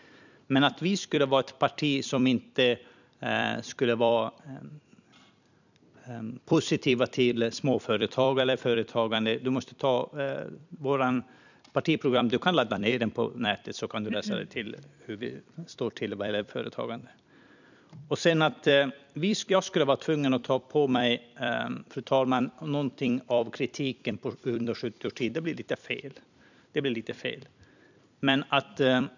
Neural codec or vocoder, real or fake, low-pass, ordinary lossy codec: vocoder, 44.1 kHz, 128 mel bands every 256 samples, BigVGAN v2; fake; 7.2 kHz; none